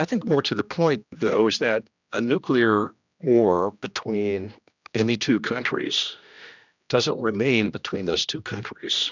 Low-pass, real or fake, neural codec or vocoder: 7.2 kHz; fake; codec, 16 kHz, 1 kbps, X-Codec, HuBERT features, trained on general audio